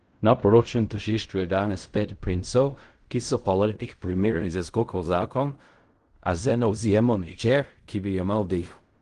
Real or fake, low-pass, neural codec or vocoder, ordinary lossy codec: fake; 10.8 kHz; codec, 16 kHz in and 24 kHz out, 0.4 kbps, LongCat-Audio-Codec, fine tuned four codebook decoder; Opus, 24 kbps